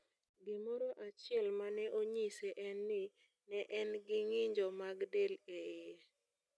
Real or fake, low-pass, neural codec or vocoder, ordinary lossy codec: real; none; none; none